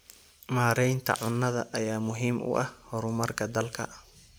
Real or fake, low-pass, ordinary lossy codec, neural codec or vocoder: real; none; none; none